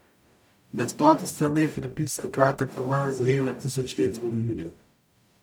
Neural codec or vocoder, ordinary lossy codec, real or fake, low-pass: codec, 44.1 kHz, 0.9 kbps, DAC; none; fake; none